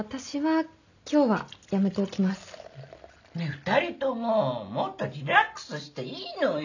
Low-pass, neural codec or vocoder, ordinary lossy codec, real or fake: 7.2 kHz; none; none; real